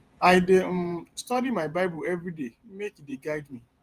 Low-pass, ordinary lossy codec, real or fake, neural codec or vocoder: 14.4 kHz; Opus, 32 kbps; fake; vocoder, 48 kHz, 128 mel bands, Vocos